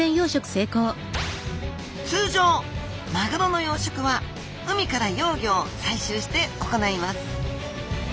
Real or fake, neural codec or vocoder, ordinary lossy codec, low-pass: real; none; none; none